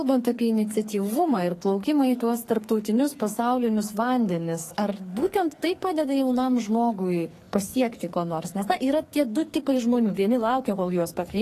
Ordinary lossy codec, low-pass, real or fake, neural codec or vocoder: AAC, 48 kbps; 14.4 kHz; fake; codec, 44.1 kHz, 2.6 kbps, SNAC